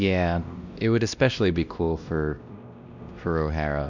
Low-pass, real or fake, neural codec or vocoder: 7.2 kHz; fake; codec, 16 kHz, 1 kbps, X-Codec, WavLM features, trained on Multilingual LibriSpeech